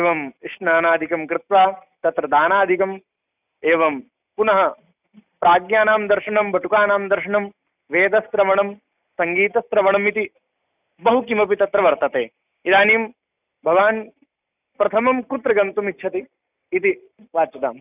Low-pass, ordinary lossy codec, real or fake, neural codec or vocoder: 3.6 kHz; none; real; none